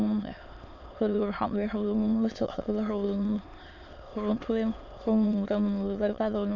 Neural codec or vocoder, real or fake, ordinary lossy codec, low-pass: autoencoder, 22.05 kHz, a latent of 192 numbers a frame, VITS, trained on many speakers; fake; none; 7.2 kHz